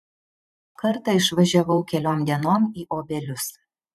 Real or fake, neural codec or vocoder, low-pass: fake; vocoder, 44.1 kHz, 128 mel bands every 512 samples, BigVGAN v2; 14.4 kHz